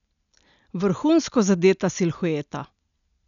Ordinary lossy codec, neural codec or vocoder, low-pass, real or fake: none; none; 7.2 kHz; real